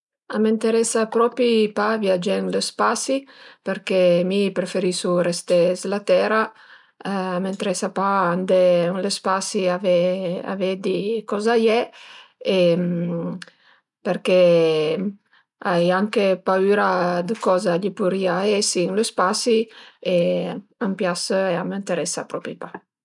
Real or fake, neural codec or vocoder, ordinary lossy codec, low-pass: real; none; none; 10.8 kHz